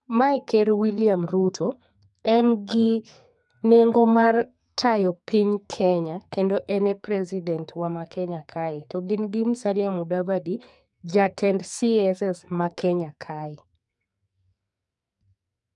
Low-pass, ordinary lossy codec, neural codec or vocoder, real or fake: 10.8 kHz; none; codec, 44.1 kHz, 2.6 kbps, SNAC; fake